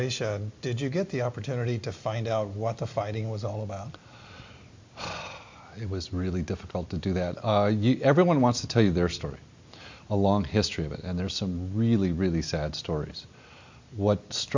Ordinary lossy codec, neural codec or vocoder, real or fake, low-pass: MP3, 48 kbps; none; real; 7.2 kHz